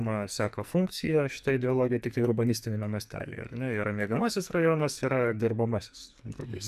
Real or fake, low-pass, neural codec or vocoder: fake; 14.4 kHz; codec, 44.1 kHz, 2.6 kbps, SNAC